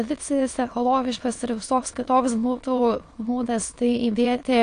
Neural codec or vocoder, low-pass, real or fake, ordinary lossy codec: autoencoder, 22.05 kHz, a latent of 192 numbers a frame, VITS, trained on many speakers; 9.9 kHz; fake; AAC, 48 kbps